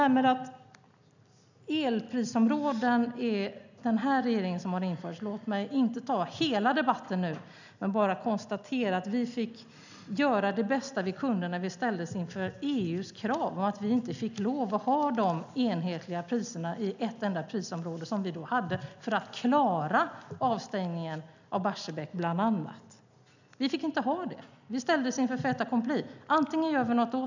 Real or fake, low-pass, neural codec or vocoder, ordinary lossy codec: real; 7.2 kHz; none; none